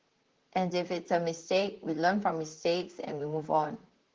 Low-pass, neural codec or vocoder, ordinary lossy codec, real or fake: 7.2 kHz; vocoder, 44.1 kHz, 128 mel bands, Pupu-Vocoder; Opus, 16 kbps; fake